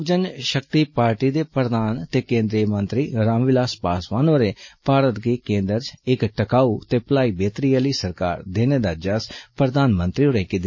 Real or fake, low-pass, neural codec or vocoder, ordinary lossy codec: real; 7.2 kHz; none; MP3, 32 kbps